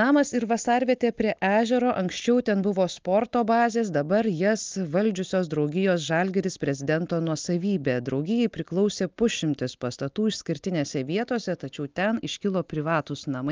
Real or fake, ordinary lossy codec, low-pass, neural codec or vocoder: real; Opus, 24 kbps; 7.2 kHz; none